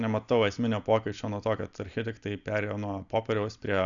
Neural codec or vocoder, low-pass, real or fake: none; 7.2 kHz; real